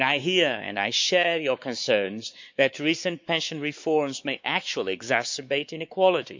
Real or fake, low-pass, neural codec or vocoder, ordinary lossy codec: fake; 7.2 kHz; codec, 16 kHz, 4 kbps, X-Codec, WavLM features, trained on Multilingual LibriSpeech; MP3, 64 kbps